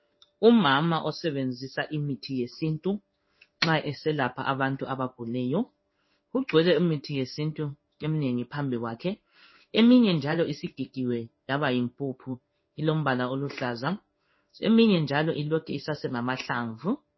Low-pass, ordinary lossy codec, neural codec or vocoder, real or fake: 7.2 kHz; MP3, 24 kbps; codec, 16 kHz in and 24 kHz out, 1 kbps, XY-Tokenizer; fake